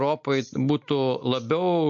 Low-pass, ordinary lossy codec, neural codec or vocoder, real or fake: 7.2 kHz; MP3, 48 kbps; none; real